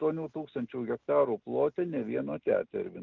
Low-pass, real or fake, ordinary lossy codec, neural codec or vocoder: 7.2 kHz; real; Opus, 32 kbps; none